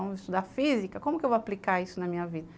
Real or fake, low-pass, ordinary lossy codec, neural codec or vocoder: real; none; none; none